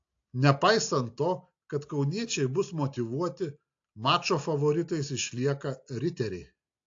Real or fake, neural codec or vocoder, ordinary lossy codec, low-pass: real; none; AAC, 48 kbps; 7.2 kHz